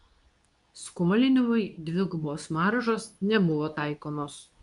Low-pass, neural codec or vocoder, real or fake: 10.8 kHz; codec, 24 kHz, 0.9 kbps, WavTokenizer, medium speech release version 2; fake